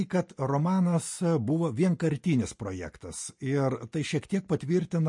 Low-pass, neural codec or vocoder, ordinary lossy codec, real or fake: 10.8 kHz; none; MP3, 48 kbps; real